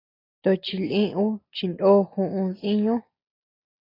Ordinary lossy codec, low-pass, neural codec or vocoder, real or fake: AAC, 24 kbps; 5.4 kHz; none; real